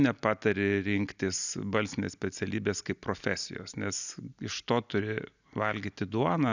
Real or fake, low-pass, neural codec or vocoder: real; 7.2 kHz; none